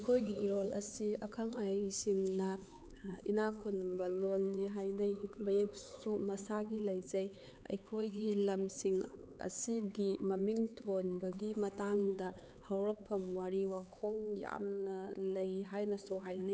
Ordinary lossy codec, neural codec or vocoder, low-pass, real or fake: none; codec, 16 kHz, 4 kbps, X-Codec, HuBERT features, trained on LibriSpeech; none; fake